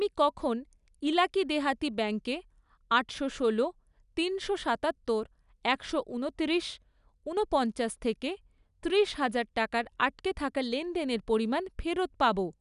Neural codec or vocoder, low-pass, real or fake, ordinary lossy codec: none; 10.8 kHz; real; none